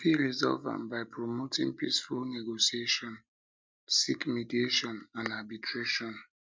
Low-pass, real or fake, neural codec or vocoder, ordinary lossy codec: none; real; none; none